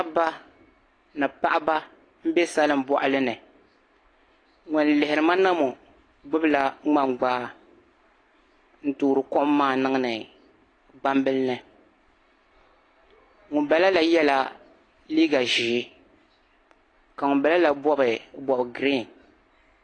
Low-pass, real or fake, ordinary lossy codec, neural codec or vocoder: 9.9 kHz; real; AAC, 32 kbps; none